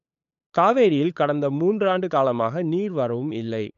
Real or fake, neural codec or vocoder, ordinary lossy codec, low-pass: fake; codec, 16 kHz, 8 kbps, FunCodec, trained on LibriTTS, 25 frames a second; none; 7.2 kHz